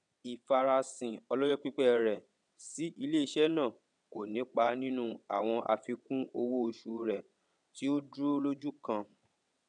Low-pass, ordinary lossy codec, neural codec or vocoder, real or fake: 9.9 kHz; none; vocoder, 22.05 kHz, 80 mel bands, WaveNeXt; fake